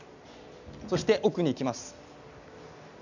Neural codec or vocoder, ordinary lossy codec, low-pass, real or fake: none; none; 7.2 kHz; real